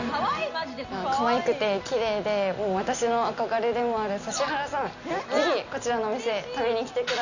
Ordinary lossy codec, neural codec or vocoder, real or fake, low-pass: none; none; real; 7.2 kHz